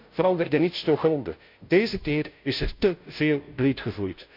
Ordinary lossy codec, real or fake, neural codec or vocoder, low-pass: MP3, 48 kbps; fake; codec, 16 kHz, 0.5 kbps, FunCodec, trained on Chinese and English, 25 frames a second; 5.4 kHz